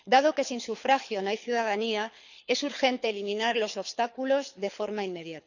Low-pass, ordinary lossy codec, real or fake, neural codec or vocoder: 7.2 kHz; none; fake; codec, 24 kHz, 6 kbps, HILCodec